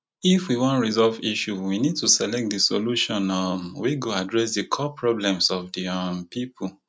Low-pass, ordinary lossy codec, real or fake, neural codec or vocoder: none; none; real; none